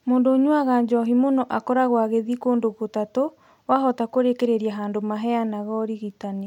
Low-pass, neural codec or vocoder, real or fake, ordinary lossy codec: 19.8 kHz; none; real; MP3, 96 kbps